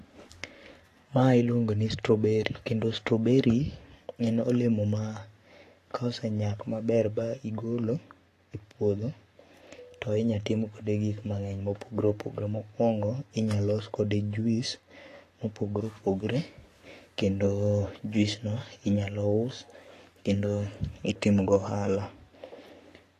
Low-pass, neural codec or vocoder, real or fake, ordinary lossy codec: 14.4 kHz; codec, 44.1 kHz, 7.8 kbps, Pupu-Codec; fake; AAC, 48 kbps